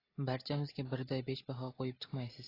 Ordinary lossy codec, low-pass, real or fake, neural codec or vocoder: AAC, 24 kbps; 5.4 kHz; real; none